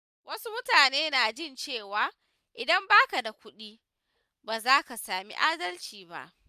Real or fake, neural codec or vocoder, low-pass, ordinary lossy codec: real; none; 14.4 kHz; none